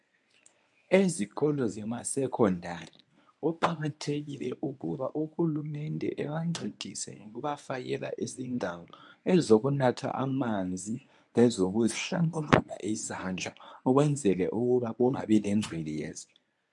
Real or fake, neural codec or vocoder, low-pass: fake; codec, 24 kHz, 0.9 kbps, WavTokenizer, medium speech release version 1; 10.8 kHz